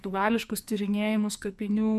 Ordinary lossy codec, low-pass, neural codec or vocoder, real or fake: MP3, 96 kbps; 14.4 kHz; codec, 44.1 kHz, 2.6 kbps, SNAC; fake